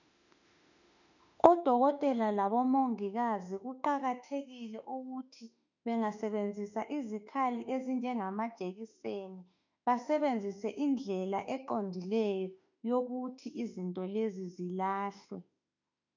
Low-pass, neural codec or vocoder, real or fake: 7.2 kHz; autoencoder, 48 kHz, 32 numbers a frame, DAC-VAE, trained on Japanese speech; fake